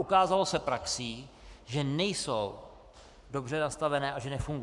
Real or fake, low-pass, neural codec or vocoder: fake; 10.8 kHz; codec, 44.1 kHz, 7.8 kbps, Pupu-Codec